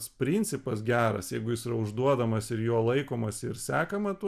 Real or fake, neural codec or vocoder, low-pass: real; none; 14.4 kHz